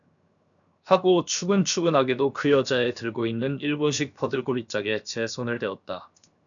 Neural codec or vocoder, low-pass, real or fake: codec, 16 kHz, 0.7 kbps, FocalCodec; 7.2 kHz; fake